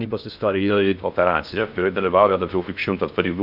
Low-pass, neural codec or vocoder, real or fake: 5.4 kHz; codec, 16 kHz in and 24 kHz out, 0.6 kbps, FocalCodec, streaming, 2048 codes; fake